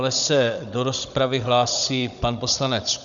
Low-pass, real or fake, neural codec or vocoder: 7.2 kHz; fake; codec, 16 kHz, 16 kbps, FunCodec, trained on Chinese and English, 50 frames a second